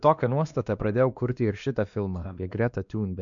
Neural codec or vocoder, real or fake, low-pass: codec, 16 kHz, 2 kbps, X-Codec, WavLM features, trained on Multilingual LibriSpeech; fake; 7.2 kHz